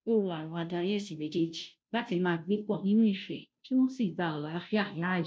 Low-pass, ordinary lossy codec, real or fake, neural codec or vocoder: none; none; fake; codec, 16 kHz, 0.5 kbps, FunCodec, trained on Chinese and English, 25 frames a second